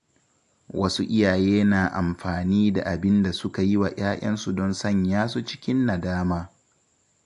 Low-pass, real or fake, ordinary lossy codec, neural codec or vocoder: 10.8 kHz; real; AAC, 64 kbps; none